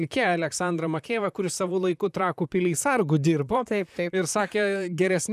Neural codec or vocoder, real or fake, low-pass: vocoder, 44.1 kHz, 128 mel bands, Pupu-Vocoder; fake; 14.4 kHz